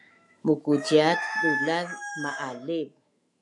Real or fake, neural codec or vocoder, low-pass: fake; autoencoder, 48 kHz, 128 numbers a frame, DAC-VAE, trained on Japanese speech; 10.8 kHz